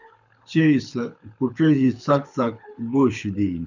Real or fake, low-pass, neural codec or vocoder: fake; 7.2 kHz; codec, 24 kHz, 6 kbps, HILCodec